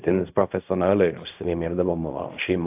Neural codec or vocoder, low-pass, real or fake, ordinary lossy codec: codec, 16 kHz in and 24 kHz out, 0.4 kbps, LongCat-Audio-Codec, fine tuned four codebook decoder; 3.6 kHz; fake; AAC, 32 kbps